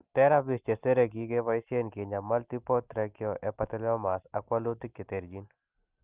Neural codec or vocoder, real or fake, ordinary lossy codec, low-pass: none; real; Opus, 24 kbps; 3.6 kHz